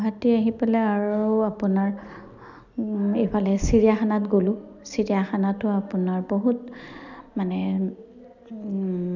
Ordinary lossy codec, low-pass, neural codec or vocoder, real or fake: none; 7.2 kHz; none; real